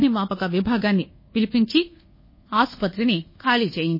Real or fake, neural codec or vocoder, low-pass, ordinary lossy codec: fake; codec, 24 kHz, 1.2 kbps, DualCodec; 5.4 kHz; MP3, 24 kbps